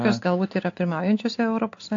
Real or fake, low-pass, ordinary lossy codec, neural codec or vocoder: real; 7.2 kHz; AAC, 48 kbps; none